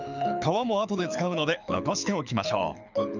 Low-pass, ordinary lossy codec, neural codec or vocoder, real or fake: 7.2 kHz; none; codec, 24 kHz, 6 kbps, HILCodec; fake